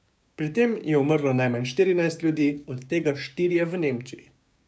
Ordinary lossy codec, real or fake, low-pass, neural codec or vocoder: none; fake; none; codec, 16 kHz, 6 kbps, DAC